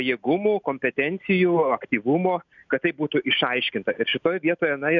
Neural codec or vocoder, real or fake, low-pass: none; real; 7.2 kHz